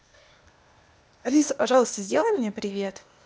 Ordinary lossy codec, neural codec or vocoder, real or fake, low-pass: none; codec, 16 kHz, 0.8 kbps, ZipCodec; fake; none